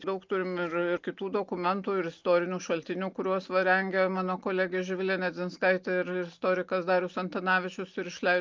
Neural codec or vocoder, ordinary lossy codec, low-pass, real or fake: none; Opus, 24 kbps; 7.2 kHz; real